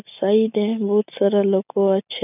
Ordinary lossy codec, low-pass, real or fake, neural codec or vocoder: none; 3.6 kHz; real; none